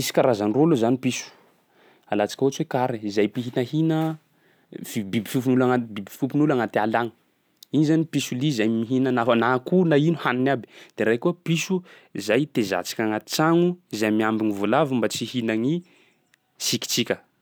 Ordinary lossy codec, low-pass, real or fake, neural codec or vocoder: none; none; real; none